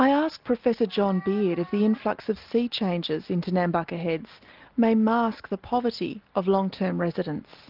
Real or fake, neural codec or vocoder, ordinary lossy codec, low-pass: real; none; Opus, 24 kbps; 5.4 kHz